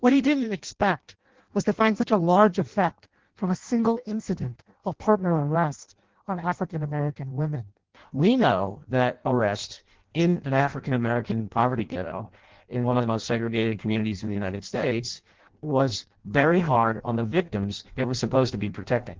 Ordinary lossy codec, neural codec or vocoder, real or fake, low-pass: Opus, 16 kbps; codec, 16 kHz in and 24 kHz out, 0.6 kbps, FireRedTTS-2 codec; fake; 7.2 kHz